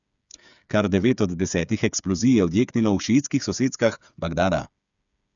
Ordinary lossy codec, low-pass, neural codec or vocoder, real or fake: none; 7.2 kHz; codec, 16 kHz, 8 kbps, FreqCodec, smaller model; fake